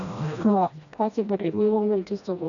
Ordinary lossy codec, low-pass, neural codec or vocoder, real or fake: none; 7.2 kHz; codec, 16 kHz, 1 kbps, FreqCodec, smaller model; fake